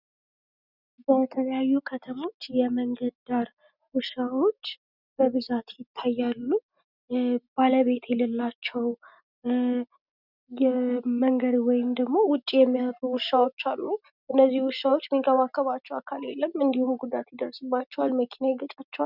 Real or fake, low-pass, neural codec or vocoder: real; 5.4 kHz; none